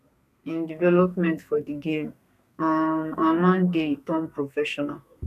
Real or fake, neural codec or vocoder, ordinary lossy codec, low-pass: fake; codec, 32 kHz, 1.9 kbps, SNAC; none; 14.4 kHz